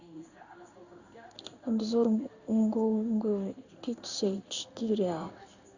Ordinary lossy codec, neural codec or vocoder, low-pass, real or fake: Opus, 64 kbps; codec, 16 kHz in and 24 kHz out, 1 kbps, XY-Tokenizer; 7.2 kHz; fake